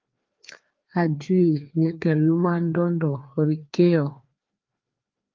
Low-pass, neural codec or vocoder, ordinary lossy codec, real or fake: 7.2 kHz; codec, 16 kHz, 2 kbps, FreqCodec, larger model; Opus, 32 kbps; fake